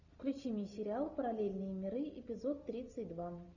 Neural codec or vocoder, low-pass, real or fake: none; 7.2 kHz; real